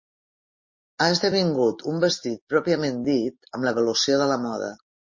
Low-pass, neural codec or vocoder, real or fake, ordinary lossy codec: 7.2 kHz; none; real; MP3, 32 kbps